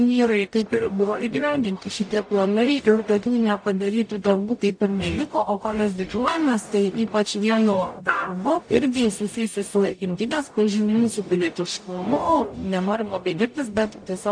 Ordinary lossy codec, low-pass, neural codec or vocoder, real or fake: AAC, 64 kbps; 9.9 kHz; codec, 44.1 kHz, 0.9 kbps, DAC; fake